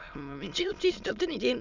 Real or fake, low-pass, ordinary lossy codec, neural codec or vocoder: fake; 7.2 kHz; none; autoencoder, 22.05 kHz, a latent of 192 numbers a frame, VITS, trained on many speakers